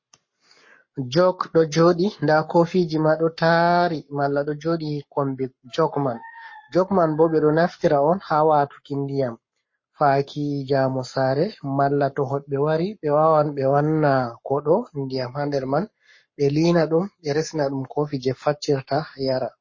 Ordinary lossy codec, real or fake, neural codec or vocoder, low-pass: MP3, 32 kbps; fake; codec, 44.1 kHz, 7.8 kbps, Pupu-Codec; 7.2 kHz